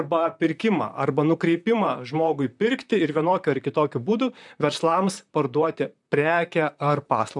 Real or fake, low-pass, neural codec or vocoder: fake; 10.8 kHz; vocoder, 44.1 kHz, 128 mel bands, Pupu-Vocoder